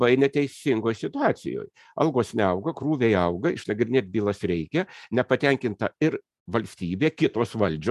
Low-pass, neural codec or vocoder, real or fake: 14.4 kHz; none; real